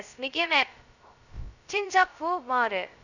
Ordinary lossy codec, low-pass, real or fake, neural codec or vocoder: none; 7.2 kHz; fake; codec, 16 kHz, 0.2 kbps, FocalCodec